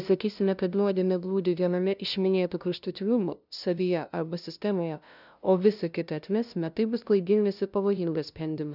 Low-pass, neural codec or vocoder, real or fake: 5.4 kHz; codec, 16 kHz, 0.5 kbps, FunCodec, trained on LibriTTS, 25 frames a second; fake